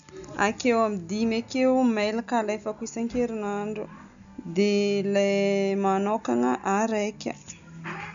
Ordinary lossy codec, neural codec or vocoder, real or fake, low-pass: none; none; real; 7.2 kHz